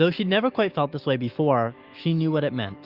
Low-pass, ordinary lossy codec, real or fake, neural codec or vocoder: 5.4 kHz; Opus, 32 kbps; fake; autoencoder, 48 kHz, 128 numbers a frame, DAC-VAE, trained on Japanese speech